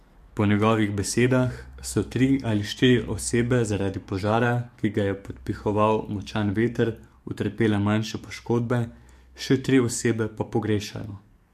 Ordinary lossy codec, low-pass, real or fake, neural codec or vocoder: MP3, 64 kbps; 14.4 kHz; fake; codec, 44.1 kHz, 7.8 kbps, DAC